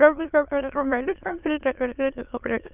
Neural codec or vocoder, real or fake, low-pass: autoencoder, 22.05 kHz, a latent of 192 numbers a frame, VITS, trained on many speakers; fake; 3.6 kHz